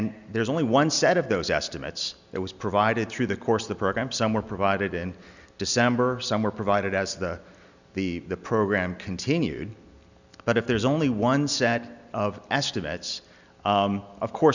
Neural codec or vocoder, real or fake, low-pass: none; real; 7.2 kHz